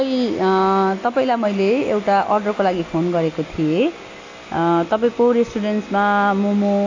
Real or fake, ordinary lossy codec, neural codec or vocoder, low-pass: real; AAC, 32 kbps; none; 7.2 kHz